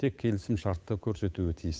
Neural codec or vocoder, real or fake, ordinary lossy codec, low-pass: codec, 16 kHz, 8 kbps, FunCodec, trained on Chinese and English, 25 frames a second; fake; none; none